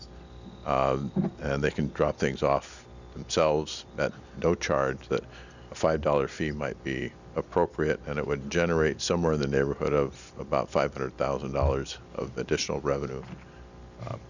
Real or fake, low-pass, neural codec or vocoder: real; 7.2 kHz; none